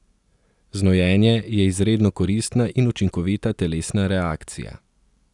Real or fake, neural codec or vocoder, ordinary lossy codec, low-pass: real; none; none; 10.8 kHz